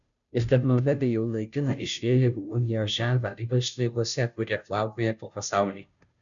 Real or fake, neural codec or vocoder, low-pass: fake; codec, 16 kHz, 0.5 kbps, FunCodec, trained on Chinese and English, 25 frames a second; 7.2 kHz